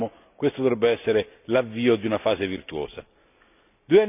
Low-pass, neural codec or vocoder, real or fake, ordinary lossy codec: 3.6 kHz; none; real; none